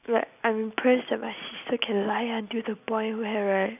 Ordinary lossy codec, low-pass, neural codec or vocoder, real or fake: none; 3.6 kHz; none; real